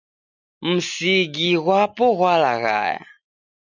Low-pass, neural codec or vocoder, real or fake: 7.2 kHz; none; real